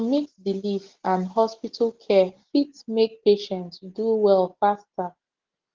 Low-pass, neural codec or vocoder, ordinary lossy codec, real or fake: 7.2 kHz; none; Opus, 16 kbps; real